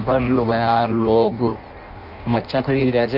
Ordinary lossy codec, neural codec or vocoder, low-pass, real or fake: none; codec, 16 kHz in and 24 kHz out, 0.6 kbps, FireRedTTS-2 codec; 5.4 kHz; fake